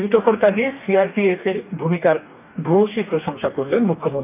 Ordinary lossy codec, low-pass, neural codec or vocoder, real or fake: none; 3.6 kHz; codec, 44.1 kHz, 2.6 kbps, DAC; fake